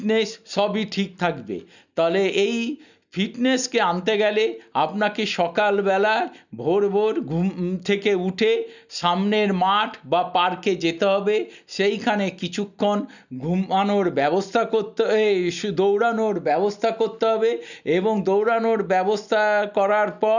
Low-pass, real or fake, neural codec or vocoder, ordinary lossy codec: 7.2 kHz; real; none; none